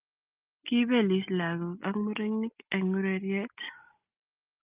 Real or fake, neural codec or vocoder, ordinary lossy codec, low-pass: real; none; Opus, 32 kbps; 3.6 kHz